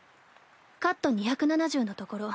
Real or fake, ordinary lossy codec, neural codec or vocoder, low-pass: real; none; none; none